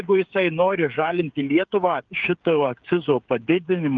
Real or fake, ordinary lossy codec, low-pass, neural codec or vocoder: fake; Opus, 32 kbps; 7.2 kHz; codec, 16 kHz, 8 kbps, FreqCodec, smaller model